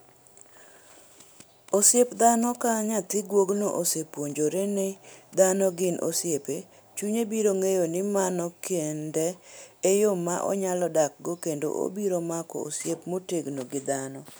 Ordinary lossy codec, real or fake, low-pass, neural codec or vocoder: none; real; none; none